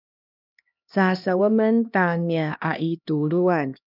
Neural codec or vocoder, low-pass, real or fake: codec, 16 kHz, 1 kbps, X-Codec, HuBERT features, trained on LibriSpeech; 5.4 kHz; fake